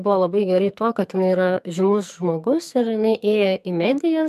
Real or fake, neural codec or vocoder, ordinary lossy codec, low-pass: fake; codec, 44.1 kHz, 2.6 kbps, SNAC; MP3, 96 kbps; 14.4 kHz